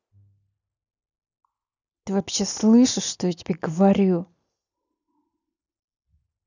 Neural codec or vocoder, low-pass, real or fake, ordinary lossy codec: none; 7.2 kHz; real; none